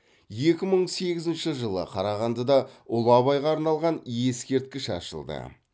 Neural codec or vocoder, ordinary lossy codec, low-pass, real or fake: none; none; none; real